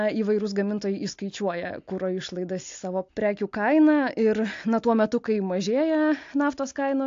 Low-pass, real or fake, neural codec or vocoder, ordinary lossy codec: 7.2 kHz; real; none; AAC, 48 kbps